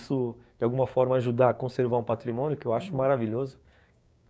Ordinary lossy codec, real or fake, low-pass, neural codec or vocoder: none; fake; none; codec, 16 kHz, 6 kbps, DAC